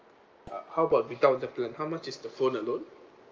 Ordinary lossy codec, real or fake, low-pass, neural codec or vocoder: Opus, 24 kbps; real; 7.2 kHz; none